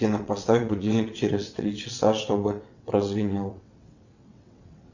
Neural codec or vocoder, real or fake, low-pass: vocoder, 22.05 kHz, 80 mel bands, WaveNeXt; fake; 7.2 kHz